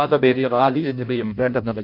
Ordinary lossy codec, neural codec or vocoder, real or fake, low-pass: none; codec, 16 kHz in and 24 kHz out, 0.6 kbps, FireRedTTS-2 codec; fake; 5.4 kHz